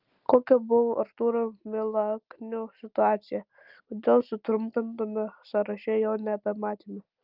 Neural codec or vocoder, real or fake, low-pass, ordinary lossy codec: none; real; 5.4 kHz; Opus, 24 kbps